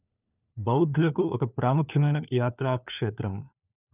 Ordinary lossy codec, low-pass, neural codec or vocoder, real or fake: none; 3.6 kHz; codec, 16 kHz, 4 kbps, FunCodec, trained on LibriTTS, 50 frames a second; fake